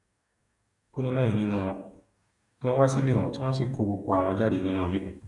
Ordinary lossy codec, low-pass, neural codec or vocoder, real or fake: none; 10.8 kHz; codec, 44.1 kHz, 2.6 kbps, DAC; fake